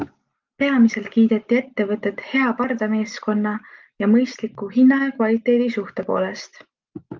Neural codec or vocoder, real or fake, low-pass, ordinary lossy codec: none; real; 7.2 kHz; Opus, 32 kbps